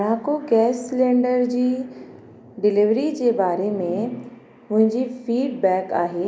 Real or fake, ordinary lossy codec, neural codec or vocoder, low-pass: real; none; none; none